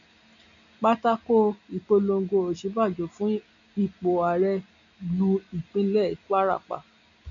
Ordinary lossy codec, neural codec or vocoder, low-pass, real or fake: none; none; 7.2 kHz; real